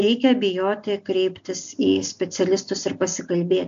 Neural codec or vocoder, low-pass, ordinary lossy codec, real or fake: none; 7.2 kHz; AAC, 64 kbps; real